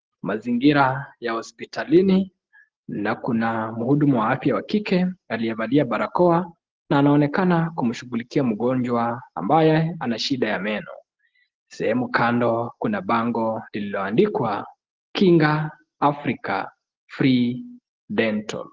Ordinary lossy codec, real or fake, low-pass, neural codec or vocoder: Opus, 16 kbps; real; 7.2 kHz; none